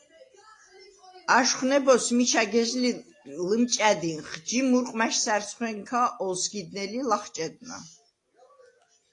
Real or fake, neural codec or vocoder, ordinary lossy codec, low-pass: real; none; AAC, 48 kbps; 10.8 kHz